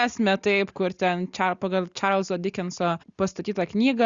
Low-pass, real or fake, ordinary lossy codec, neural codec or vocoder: 7.2 kHz; real; Opus, 64 kbps; none